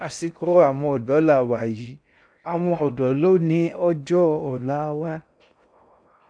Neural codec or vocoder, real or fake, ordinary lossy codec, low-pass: codec, 16 kHz in and 24 kHz out, 0.6 kbps, FocalCodec, streaming, 4096 codes; fake; none; 9.9 kHz